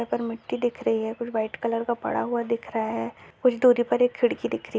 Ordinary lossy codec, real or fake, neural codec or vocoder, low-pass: none; real; none; none